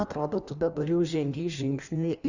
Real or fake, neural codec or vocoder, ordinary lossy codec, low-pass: fake; codec, 16 kHz in and 24 kHz out, 1.1 kbps, FireRedTTS-2 codec; Opus, 64 kbps; 7.2 kHz